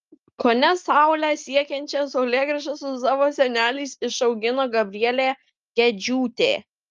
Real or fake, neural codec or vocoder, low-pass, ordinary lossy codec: real; none; 7.2 kHz; Opus, 32 kbps